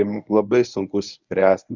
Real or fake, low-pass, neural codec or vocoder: fake; 7.2 kHz; codec, 24 kHz, 0.9 kbps, WavTokenizer, medium speech release version 1